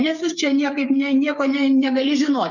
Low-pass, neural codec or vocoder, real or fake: 7.2 kHz; codec, 16 kHz, 8 kbps, FreqCodec, smaller model; fake